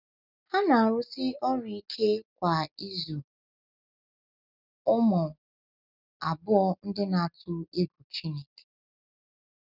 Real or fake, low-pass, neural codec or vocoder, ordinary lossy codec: real; 5.4 kHz; none; none